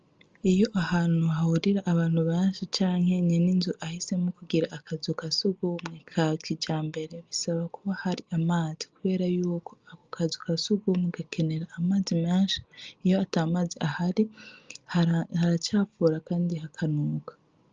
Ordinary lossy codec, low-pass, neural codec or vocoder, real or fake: Opus, 24 kbps; 7.2 kHz; none; real